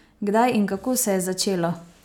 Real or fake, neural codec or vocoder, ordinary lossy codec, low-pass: real; none; none; 19.8 kHz